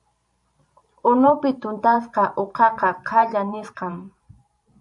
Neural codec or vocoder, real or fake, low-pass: vocoder, 44.1 kHz, 128 mel bands every 512 samples, BigVGAN v2; fake; 10.8 kHz